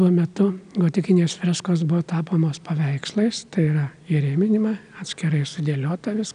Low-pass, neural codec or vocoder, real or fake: 9.9 kHz; none; real